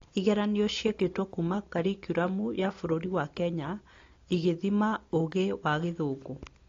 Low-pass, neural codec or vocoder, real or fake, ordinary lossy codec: 7.2 kHz; none; real; AAC, 32 kbps